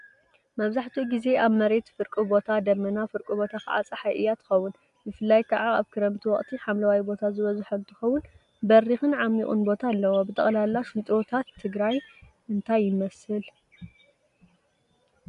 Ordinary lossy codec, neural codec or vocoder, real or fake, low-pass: MP3, 64 kbps; none; real; 10.8 kHz